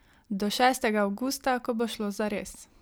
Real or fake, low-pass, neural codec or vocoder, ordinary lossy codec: real; none; none; none